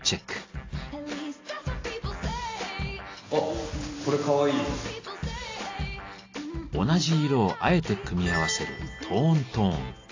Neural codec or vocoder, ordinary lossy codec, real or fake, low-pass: none; AAC, 32 kbps; real; 7.2 kHz